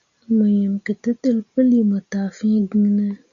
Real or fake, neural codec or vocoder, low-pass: real; none; 7.2 kHz